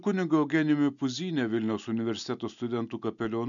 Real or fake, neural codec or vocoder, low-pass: real; none; 7.2 kHz